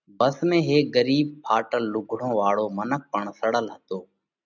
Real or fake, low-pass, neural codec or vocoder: real; 7.2 kHz; none